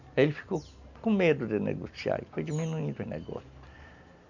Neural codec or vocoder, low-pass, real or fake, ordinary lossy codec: none; 7.2 kHz; real; none